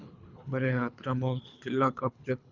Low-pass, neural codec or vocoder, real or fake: 7.2 kHz; codec, 24 kHz, 3 kbps, HILCodec; fake